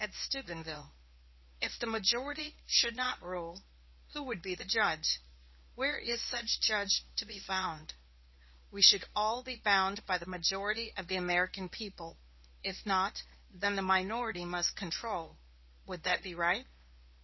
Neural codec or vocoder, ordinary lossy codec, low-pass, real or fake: codec, 24 kHz, 0.9 kbps, WavTokenizer, medium speech release version 1; MP3, 24 kbps; 7.2 kHz; fake